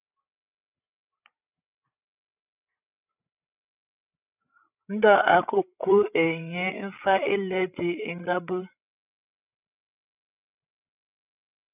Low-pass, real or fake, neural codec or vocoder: 3.6 kHz; fake; codec, 16 kHz, 16 kbps, FreqCodec, larger model